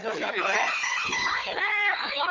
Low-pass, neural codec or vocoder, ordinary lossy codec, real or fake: 7.2 kHz; codec, 16 kHz, 4 kbps, FunCodec, trained on Chinese and English, 50 frames a second; Opus, 32 kbps; fake